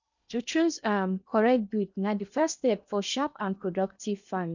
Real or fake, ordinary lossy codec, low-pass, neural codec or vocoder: fake; none; 7.2 kHz; codec, 16 kHz in and 24 kHz out, 0.8 kbps, FocalCodec, streaming, 65536 codes